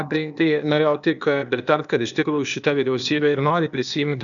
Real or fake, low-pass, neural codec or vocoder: fake; 7.2 kHz; codec, 16 kHz, 0.8 kbps, ZipCodec